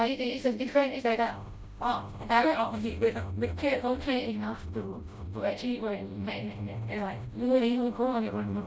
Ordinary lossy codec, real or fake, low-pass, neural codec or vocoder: none; fake; none; codec, 16 kHz, 0.5 kbps, FreqCodec, smaller model